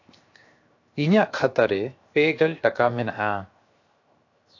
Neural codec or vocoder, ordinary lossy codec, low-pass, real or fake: codec, 16 kHz, 0.7 kbps, FocalCodec; AAC, 48 kbps; 7.2 kHz; fake